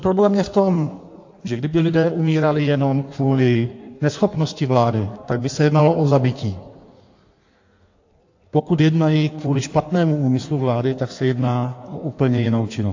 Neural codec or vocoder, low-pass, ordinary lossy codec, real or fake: codec, 16 kHz in and 24 kHz out, 1.1 kbps, FireRedTTS-2 codec; 7.2 kHz; AAC, 48 kbps; fake